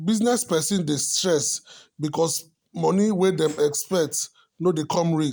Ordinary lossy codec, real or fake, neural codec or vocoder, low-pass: none; real; none; none